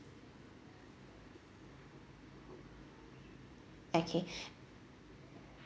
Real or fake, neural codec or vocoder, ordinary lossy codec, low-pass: real; none; none; none